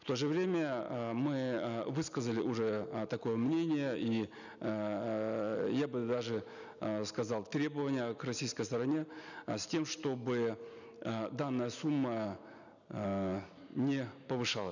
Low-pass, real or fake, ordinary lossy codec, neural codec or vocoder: 7.2 kHz; real; none; none